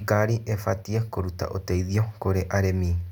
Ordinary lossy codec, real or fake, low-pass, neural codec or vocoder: none; real; 19.8 kHz; none